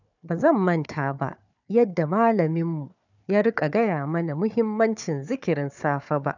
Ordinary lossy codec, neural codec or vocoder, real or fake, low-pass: none; codec, 16 kHz, 4 kbps, FunCodec, trained on Chinese and English, 50 frames a second; fake; 7.2 kHz